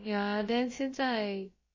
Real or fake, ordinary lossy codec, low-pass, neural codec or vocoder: fake; MP3, 32 kbps; 7.2 kHz; codec, 16 kHz, about 1 kbps, DyCAST, with the encoder's durations